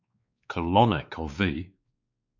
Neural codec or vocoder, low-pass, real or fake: codec, 16 kHz, 4 kbps, X-Codec, WavLM features, trained on Multilingual LibriSpeech; 7.2 kHz; fake